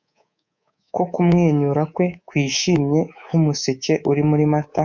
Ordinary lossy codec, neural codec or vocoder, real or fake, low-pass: MP3, 64 kbps; codec, 24 kHz, 3.1 kbps, DualCodec; fake; 7.2 kHz